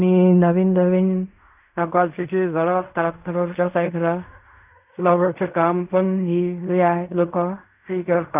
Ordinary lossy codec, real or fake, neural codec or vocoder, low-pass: none; fake; codec, 16 kHz in and 24 kHz out, 0.4 kbps, LongCat-Audio-Codec, fine tuned four codebook decoder; 3.6 kHz